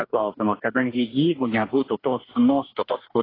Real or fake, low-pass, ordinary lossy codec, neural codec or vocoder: fake; 5.4 kHz; AAC, 24 kbps; codec, 44.1 kHz, 2.6 kbps, DAC